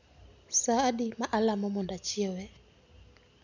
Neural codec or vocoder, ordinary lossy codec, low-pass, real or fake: none; none; 7.2 kHz; real